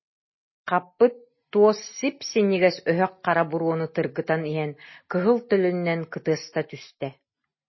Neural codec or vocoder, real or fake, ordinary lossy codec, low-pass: none; real; MP3, 24 kbps; 7.2 kHz